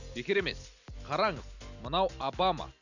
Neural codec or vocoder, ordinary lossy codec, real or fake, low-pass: none; none; real; 7.2 kHz